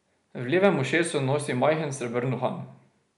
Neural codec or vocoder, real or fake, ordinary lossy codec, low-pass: none; real; none; 10.8 kHz